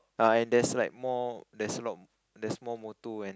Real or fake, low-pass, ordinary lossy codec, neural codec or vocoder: real; none; none; none